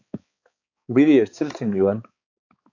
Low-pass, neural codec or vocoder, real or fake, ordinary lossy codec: 7.2 kHz; codec, 16 kHz, 4 kbps, X-Codec, HuBERT features, trained on balanced general audio; fake; AAC, 48 kbps